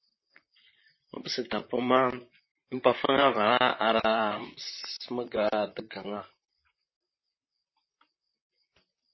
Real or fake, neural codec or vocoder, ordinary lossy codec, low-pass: fake; vocoder, 44.1 kHz, 128 mel bands, Pupu-Vocoder; MP3, 24 kbps; 7.2 kHz